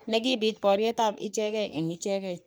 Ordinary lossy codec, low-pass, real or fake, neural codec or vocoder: none; none; fake; codec, 44.1 kHz, 3.4 kbps, Pupu-Codec